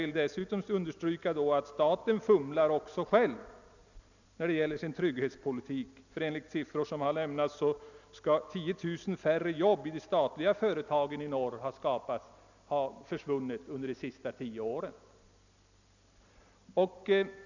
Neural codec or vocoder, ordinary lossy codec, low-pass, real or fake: none; none; 7.2 kHz; real